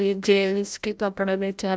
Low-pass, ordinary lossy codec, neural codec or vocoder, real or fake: none; none; codec, 16 kHz, 0.5 kbps, FreqCodec, larger model; fake